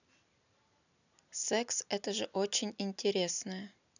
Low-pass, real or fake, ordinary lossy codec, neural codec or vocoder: 7.2 kHz; real; none; none